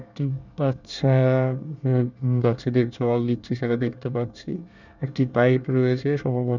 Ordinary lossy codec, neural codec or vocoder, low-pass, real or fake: none; codec, 24 kHz, 1 kbps, SNAC; 7.2 kHz; fake